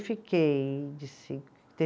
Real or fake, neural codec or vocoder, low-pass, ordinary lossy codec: real; none; none; none